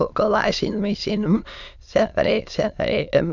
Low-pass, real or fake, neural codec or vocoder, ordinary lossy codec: 7.2 kHz; fake; autoencoder, 22.05 kHz, a latent of 192 numbers a frame, VITS, trained on many speakers; none